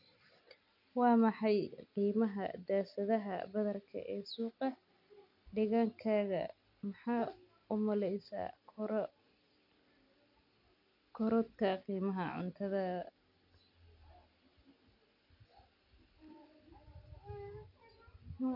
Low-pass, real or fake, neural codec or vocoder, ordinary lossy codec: 5.4 kHz; real; none; none